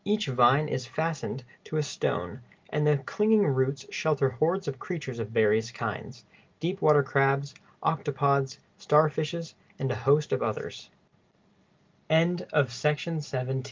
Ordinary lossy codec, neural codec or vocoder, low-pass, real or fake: Opus, 32 kbps; none; 7.2 kHz; real